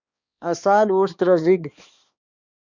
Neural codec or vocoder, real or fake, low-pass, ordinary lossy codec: codec, 16 kHz, 2 kbps, X-Codec, HuBERT features, trained on balanced general audio; fake; 7.2 kHz; Opus, 64 kbps